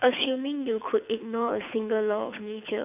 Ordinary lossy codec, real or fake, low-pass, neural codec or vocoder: none; fake; 3.6 kHz; codec, 24 kHz, 6 kbps, HILCodec